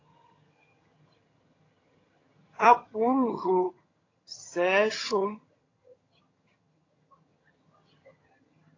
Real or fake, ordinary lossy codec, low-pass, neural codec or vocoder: fake; AAC, 32 kbps; 7.2 kHz; vocoder, 22.05 kHz, 80 mel bands, HiFi-GAN